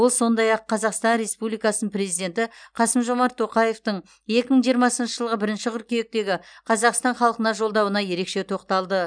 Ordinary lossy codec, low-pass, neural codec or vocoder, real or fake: none; 9.9 kHz; none; real